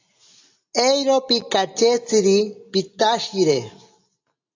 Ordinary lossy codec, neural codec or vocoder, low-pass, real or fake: AAC, 48 kbps; none; 7.2 kHz; real